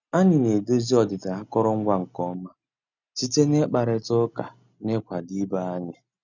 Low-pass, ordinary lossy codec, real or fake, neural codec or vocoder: 7.2 kHz; none; real; none